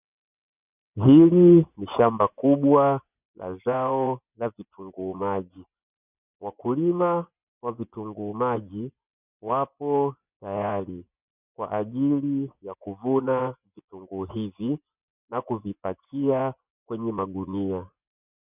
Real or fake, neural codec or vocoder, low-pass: fake; vocoder, 24 kHz, 100 mel bands, Vocos; 3.6 kHz